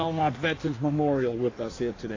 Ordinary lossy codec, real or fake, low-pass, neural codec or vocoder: AAC, 32 kbps; fake; 7.2 kHz; codec, 16 kHz in and 24 kHz out, 1.1 kbps, FireRedTTS-2 codec